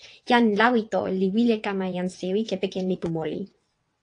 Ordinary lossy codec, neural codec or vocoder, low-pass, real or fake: AAC, 48 kbps; vocoder, 22.05 kHz, 80 mel bands, WaveNeXt; 9.9 kHz; fake